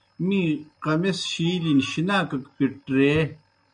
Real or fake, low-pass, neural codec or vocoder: real; 9.9 kHz; none